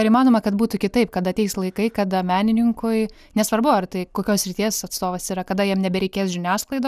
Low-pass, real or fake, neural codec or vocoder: 14.4 kHz; real; none